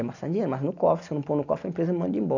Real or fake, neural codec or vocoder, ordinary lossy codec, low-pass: real; none; none; 7.2 kHz